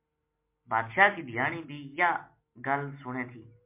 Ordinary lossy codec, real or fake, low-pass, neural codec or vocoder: MP3, 24 kbps; real; 3.6 kHz; none